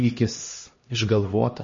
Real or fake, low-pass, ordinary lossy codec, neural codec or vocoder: fake; 7.2 kHz; MP3, 32 kbps; codec, 16 kHz, 1 kbps, X-Codec, HuBERT features, trained on LibriSpeech